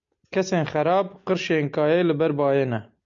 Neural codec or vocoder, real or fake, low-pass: none; real; 7.2 kHz